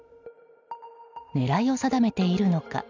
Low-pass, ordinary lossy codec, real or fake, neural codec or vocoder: 7.2 kHz; none; real; none